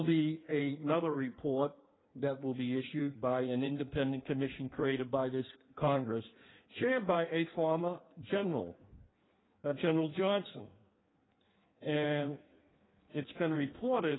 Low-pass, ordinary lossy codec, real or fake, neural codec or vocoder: 7.2 kHz; AAC, 16 kbps; fake; codec, 16 kHz in and 24 kHz out, 1.1 kbps, FireRedTTS-2 codec